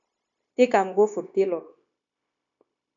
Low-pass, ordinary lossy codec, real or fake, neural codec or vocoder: 7.2 kHz; AAC, 64 kbps; fake; codec, 16 kHz, 0.9 kbps, LongCat-Audio-Codec